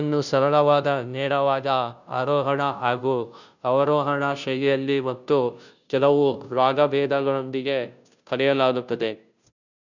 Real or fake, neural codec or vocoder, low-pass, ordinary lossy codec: fake; codec, 16 kHz, 0.5 kbps, FunCodec, trained on Chinese and English, 25 frames a second; 7.2 kHz; none